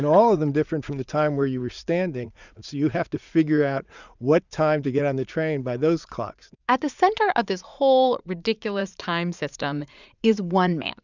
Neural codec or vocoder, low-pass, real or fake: vocoder, 44.1 kHz, 80 mel bands, Vocos; 7.2 kHz; fake